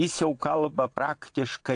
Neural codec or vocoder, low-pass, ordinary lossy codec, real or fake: vocoder, 22.05 kHz, 80 mel bands, WaveNeXt; 9.9 kHz; AAC, 48 kbps; fake